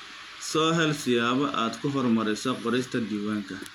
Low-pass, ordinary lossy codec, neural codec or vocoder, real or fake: 19.8 kHz; none; none; real